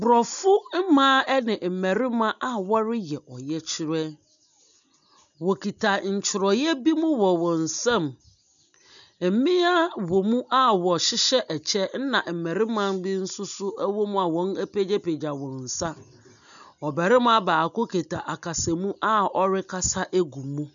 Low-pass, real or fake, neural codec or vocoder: 7.2 kHz; real; none